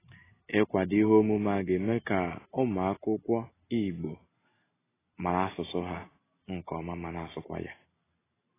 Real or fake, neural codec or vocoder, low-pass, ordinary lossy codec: real; none; 3.6 kHz; AAC, 16 kbps